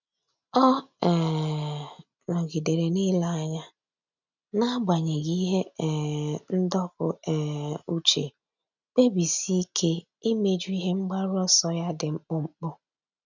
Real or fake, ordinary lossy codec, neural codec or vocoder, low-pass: real; none; none; 7.2 kHz